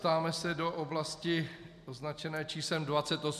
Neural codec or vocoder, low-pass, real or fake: none; 14.4 kHz; real